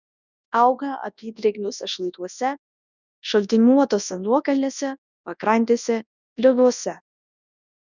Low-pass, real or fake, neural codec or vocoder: 7.2 kHz; fake; codec, 24 kHz, 0.9 kbps, WavTokenizer, large speech release